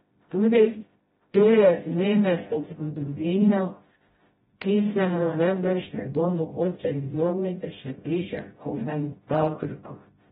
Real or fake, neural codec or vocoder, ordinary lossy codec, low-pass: fake; codec, 16 kHz, 0.5 kbps, FreqCodec, smaller model; AAC, 16 kbps; 7.2 kHz